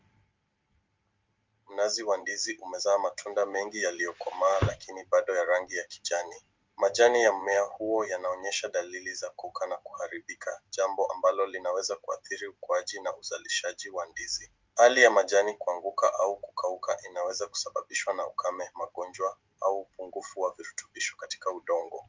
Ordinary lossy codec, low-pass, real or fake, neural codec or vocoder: Opus, 24 kbps; 7.2 kHz; real; none